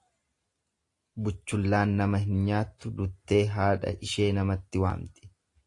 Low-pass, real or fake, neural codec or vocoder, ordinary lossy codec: 10.8 kHz; real; none; AAC, 48 kbps